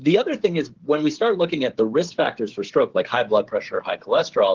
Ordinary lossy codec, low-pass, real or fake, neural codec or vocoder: Opus, 16 kbps; 7.2 kHz; fake; codec, 16 kHz, 8 kbps, FreqCodec, smaller model